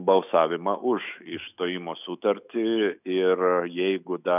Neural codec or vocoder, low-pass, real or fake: autoencoder, 48 kHz, 128 numbers a frame, DAC-VAE, trained on Japanese speech; 3.6 kHz; fake